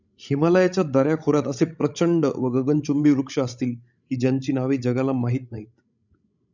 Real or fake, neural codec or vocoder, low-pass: fake; codec, 16 kHz, 16 kbps, FreqCodec, larger model; 7.2 kHz